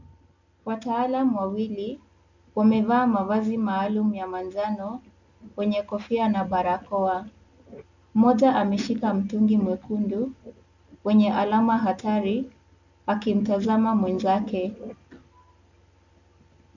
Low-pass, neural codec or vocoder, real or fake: 7.2 kHz; none; real